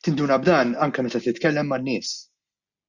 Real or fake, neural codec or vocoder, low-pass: real; none; 7.2 kHz